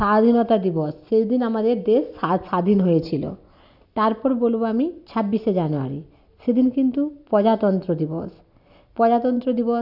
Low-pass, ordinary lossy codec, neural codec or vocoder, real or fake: 5.4 kHz; none; none; real